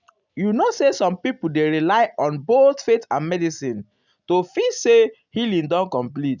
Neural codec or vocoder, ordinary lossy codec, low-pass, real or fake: none; none; 7.2 kHz; real